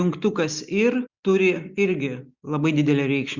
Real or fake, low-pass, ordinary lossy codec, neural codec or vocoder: real; 7.2 kHz; Opus, 64 kbps; none